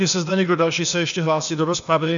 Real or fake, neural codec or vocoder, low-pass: fake; codec, 16 kHz, 0.8 kbps, ZipCodec; 7.2 kHz